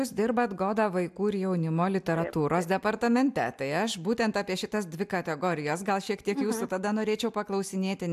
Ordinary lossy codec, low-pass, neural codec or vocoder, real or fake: AAC, 96 kbps; 14.4 kHz; none; real